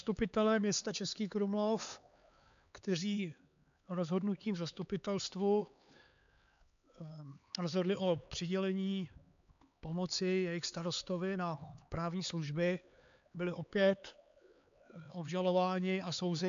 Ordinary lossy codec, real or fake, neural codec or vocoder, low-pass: AAC, 96 kbps; fake; codec, 16 kHz, 4 kbps, X-Codec, HuBERT features, trained on LibriSpeech; 7.2 kHz